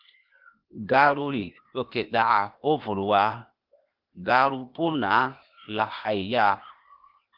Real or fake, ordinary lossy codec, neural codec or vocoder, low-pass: fake; Opus, 24 kbps; codec, 16 kHz, 0.8 kbps, ZipCodec; 5.4 kHz